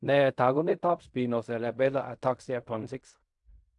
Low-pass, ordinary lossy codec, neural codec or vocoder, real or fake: 10.8 kHz; AAC, 64 kbps; codec, 16 kHz in and 24 kHz out, 0.4 kbps, LongCat-Audio-Codec, fine tuned four codebook decoder; fake